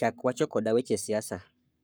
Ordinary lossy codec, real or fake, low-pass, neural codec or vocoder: none; fake; none; codec, 44.1 kHz, 7.8 kbps, Pupu-Codec